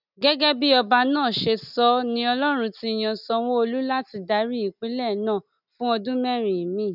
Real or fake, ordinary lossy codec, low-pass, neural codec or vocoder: real; none; 5.4 kHz; none